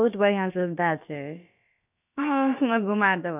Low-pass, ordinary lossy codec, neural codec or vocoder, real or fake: 3.6 kHz; none; codec, 16 kHz, about 1 kbps, DyCAST, with the encoder's durations; fake